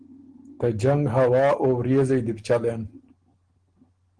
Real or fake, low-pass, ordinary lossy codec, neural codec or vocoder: real; 9.9 kHz; Opus, 16 kbps; none